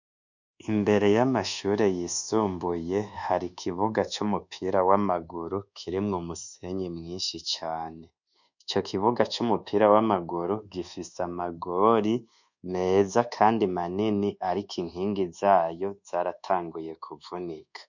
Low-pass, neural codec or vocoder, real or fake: 7.2 kHz; codec, 24 kHz, 1.2 kbps, DualCodec; fake